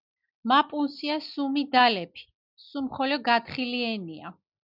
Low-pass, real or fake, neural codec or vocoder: 5.4 kHz; real; none